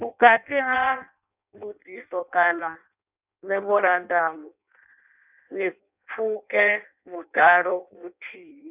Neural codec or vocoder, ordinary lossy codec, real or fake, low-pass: codec, 16 kHz in and 24 kHz out, 0.6 kbps, FireRedTTS-2 codec; none; fake; 3.6 kHz